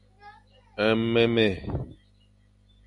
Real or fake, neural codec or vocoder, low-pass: real; none; 10.8 kHz